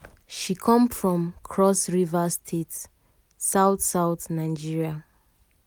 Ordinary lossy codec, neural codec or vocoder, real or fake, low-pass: none; none; real; none